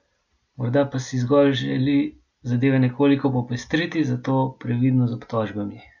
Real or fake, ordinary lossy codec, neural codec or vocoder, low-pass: real; none; none; 7.2 kHz